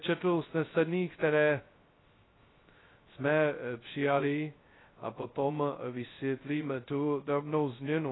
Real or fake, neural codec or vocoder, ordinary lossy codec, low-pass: fake; codec, 16 kHz, 0.2 kbps, FocalCodec; AAC, 16 kbps; 7.2 kHz